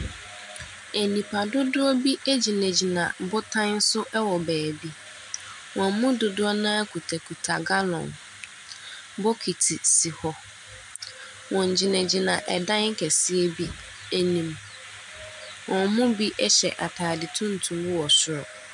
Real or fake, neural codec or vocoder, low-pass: real; none; 10.8 kHz